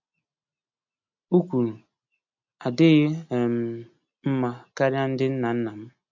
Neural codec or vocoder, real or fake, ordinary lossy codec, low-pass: none; real; MP3, 64 kbps; 7.2 kHz